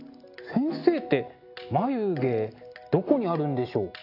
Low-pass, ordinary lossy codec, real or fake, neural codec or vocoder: 5.4 kHz; AAC, 32 kbps; real; none